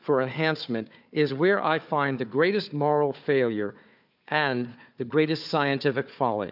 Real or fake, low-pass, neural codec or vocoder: fake; 5.4 kHz; codec, 16 kHz, 4 kbps, FunCodec, trained on Chinese and English, 50 frames a second